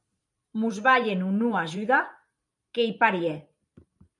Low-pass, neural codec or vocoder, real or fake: 10.8 kHz; vocoder, 44.1 kHz, 128 mel bands every 512 samples, BigVGAN v2; fake